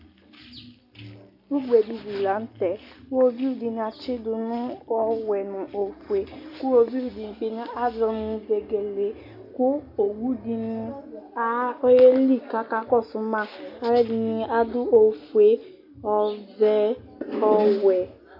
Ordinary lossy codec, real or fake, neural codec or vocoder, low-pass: AAC, 48 kbps; real; none; 5.4 kHz